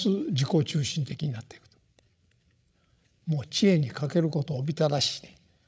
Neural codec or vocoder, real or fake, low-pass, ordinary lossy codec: codec, 16 kHz, 16 kbps, FreqCodec, larger model; fake; none; none